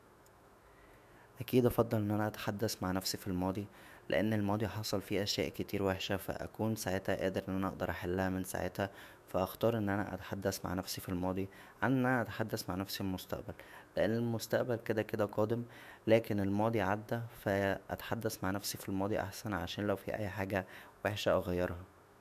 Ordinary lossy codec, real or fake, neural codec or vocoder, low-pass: none; fake; autoencoder, 48 kHz, 128 numbers a frame, DAC-VAE, trained on Japanese speech; 14.4 kHz